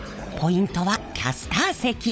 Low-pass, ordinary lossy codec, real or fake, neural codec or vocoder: none; none; fake; codec, 16 kHz, 16 kbps, FunCodec, trained on LibriTTS, 50 frames a second